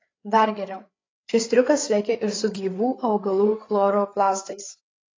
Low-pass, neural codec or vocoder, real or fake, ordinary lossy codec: 7.2 kHz; codec, 16 kHz, 8 kbps, FreqCodec, larger model; fake; AAC, 32 kbps